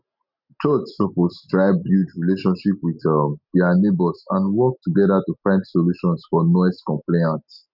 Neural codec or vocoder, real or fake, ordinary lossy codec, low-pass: none; real; none; 5.4 kHz